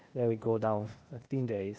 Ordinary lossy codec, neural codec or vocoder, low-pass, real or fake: none; codec, 16 kHz, 0.8 kbps, ZipCodec; none; fake